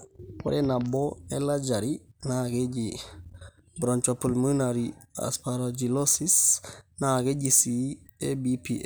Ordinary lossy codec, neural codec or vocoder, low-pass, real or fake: none; none; none; real